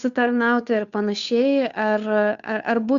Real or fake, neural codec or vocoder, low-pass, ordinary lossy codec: fake; codec, 16 kHz, 2 kbps, FunCodec, trained on Chinese and English, 25 frames a second; 7.2 kHz; Opus, 64 kbps